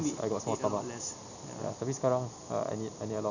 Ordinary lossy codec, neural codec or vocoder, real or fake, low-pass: none; none; real; 7.2 kHz